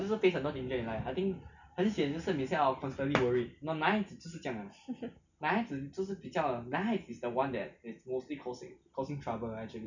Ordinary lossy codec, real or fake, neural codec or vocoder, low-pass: none; real; none; 7.2 kHz